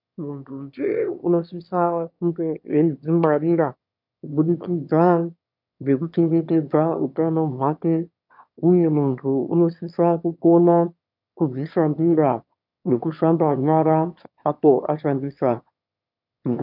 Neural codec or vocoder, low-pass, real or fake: autoencoder, 22.05 kHz, a latent of 192 numbers a frame, VITS, trained on one speaker; 5.4 kHz; fake